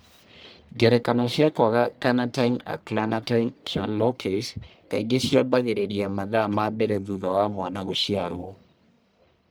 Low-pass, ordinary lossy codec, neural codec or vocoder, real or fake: none; none; codec, 44.1 kHz, 1.7 kbps, Pupu-Codec; fake